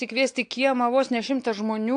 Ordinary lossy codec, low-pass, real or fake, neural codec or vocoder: AAC, 64 kbps; 9.9 kHz; real; none